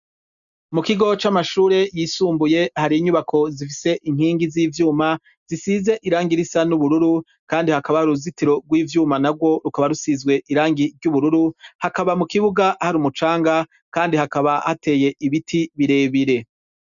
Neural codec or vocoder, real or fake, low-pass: none; real; 7.2 kHz